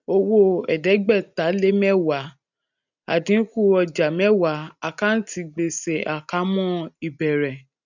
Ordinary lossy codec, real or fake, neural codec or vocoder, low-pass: none; real; none; 7.2 kHz